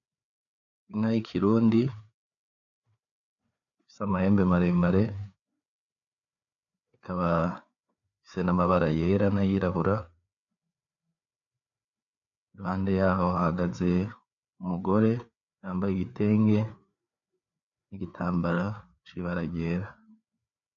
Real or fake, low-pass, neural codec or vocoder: fake; 7.2 kHz; codec, 16 kHz, 4 kbps, FreqCodec, larger model